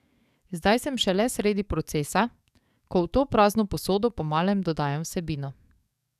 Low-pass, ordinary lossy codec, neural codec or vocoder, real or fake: 14.4 kHz; none; codec, 44.1 kHz, 7.8 kbps, DAC; fake